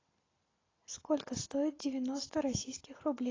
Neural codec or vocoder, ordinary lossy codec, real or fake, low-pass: none; AAC, 32 kbps; real; 7.2 kHz